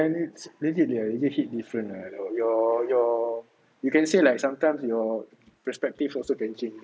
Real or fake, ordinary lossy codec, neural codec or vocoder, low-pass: real; none; none; none